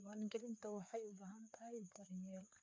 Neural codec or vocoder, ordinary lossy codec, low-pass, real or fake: codec, 16 kHz, 4 kbps, FreqCodec, larger model; none; none; fake